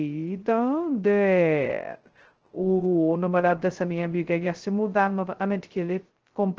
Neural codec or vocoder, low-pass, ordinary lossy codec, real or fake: codec, 16 kHz, 0.2 kbps, FocalCodec; 7.2 kHz; Opus, 16 kbps; fake